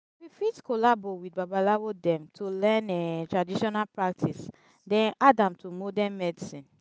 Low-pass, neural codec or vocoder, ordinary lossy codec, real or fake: none; none; none; real